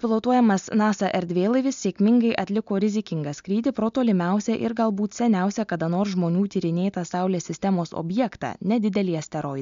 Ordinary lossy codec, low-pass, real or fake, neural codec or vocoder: MP3, 64 kbps; 7.2 kHz; real; none